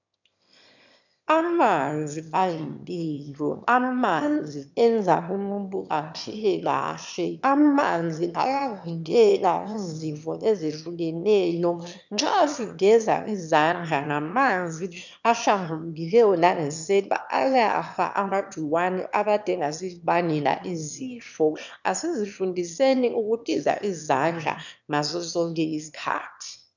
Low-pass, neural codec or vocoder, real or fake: 7.2 kHz; autoencoder, 22.05 kHz, a latent of 192 numbers a frame, VITS, trained on one speaker; fake